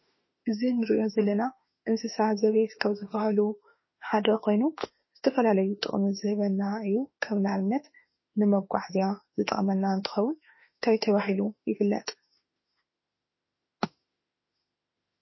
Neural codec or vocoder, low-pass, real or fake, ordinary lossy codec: autoencoder, 48 kHz, 32 numbers a frame, DAC-VAE, trained on Japanese speech; 7.2 kHz; fake; MP3, 24 kbps